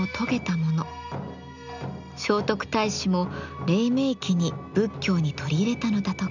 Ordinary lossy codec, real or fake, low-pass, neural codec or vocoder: none; real; 7.2 kHz; none